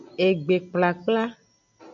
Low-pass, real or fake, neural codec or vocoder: 7.2 kHz; real; none